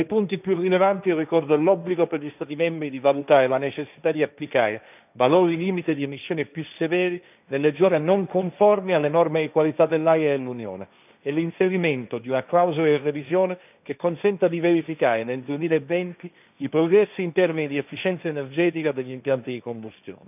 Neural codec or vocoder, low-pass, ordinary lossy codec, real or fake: codec, 16 kHz, 1.1 kbps, Voila-Tokenizer; 3.6 kHz; none; fake